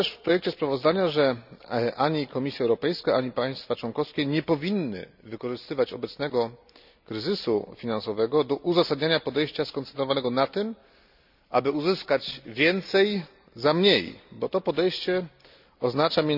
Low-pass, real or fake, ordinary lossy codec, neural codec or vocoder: 5.4 kHz; real; none; none